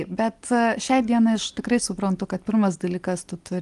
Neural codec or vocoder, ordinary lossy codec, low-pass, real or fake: none; Opus, 24 kbps; 10.8 kHz; real